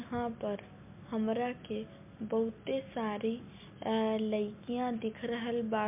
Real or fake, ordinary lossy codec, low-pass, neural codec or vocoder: real; MP3, 32 kbps; 3.6 kHz; none